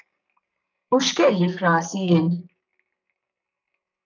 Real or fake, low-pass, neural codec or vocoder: fake; 7.2 kHz; codec, 44.1 kHz, 2.6 kbps, SNAC